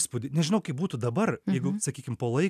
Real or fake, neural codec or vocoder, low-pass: real; none; 14.4 kHz